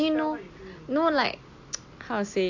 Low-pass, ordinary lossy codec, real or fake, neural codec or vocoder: 7.2 kHz; AAC, 48 kbps; real; none